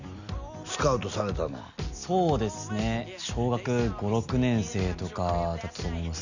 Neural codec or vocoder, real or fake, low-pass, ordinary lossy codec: none; real; 7.2 kHz; none